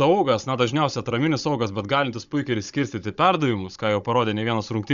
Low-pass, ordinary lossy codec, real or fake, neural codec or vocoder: 7.2 kHz; MP3, 96 kbps; fake; codec, 16 kHz, 16 kbps, FunCodec, trained on Chinese and English, 50 frames a second